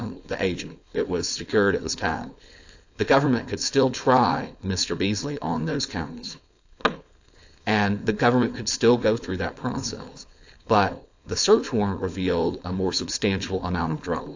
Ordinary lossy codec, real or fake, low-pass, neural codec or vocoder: AAC, 48 kbps; fake; 7.2 kHz; codec, 16 kHz, 4.8 kbps, FACodec